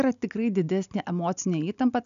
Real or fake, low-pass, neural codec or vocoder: real; 7.2 kHz; none